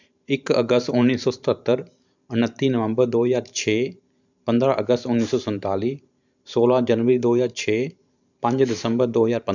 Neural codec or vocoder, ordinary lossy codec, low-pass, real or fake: vocoder, 44.1 kHz, 128 mel bands every 512 samples, BigVGAN v2; none; 7.2 kHz; fake